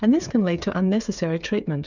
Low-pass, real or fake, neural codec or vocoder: 7.2 kHz; fake; codec, 16 kHz, 8 kbps, FreqCodec, smaller model